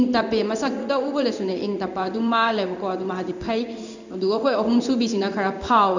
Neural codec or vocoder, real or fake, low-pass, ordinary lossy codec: codec, 16 kHz in and 24 kHz out, 1 kbps, XY-Tokenizer; fake; 7.2 kHz; none